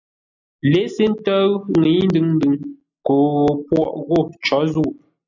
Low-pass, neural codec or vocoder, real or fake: 7.2 kHz; none; real